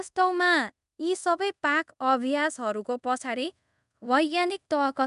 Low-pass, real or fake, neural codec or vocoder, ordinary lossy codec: 10.8 kHz; fake; codec, 24 kHz, 0.5 kbps, DualCodec; none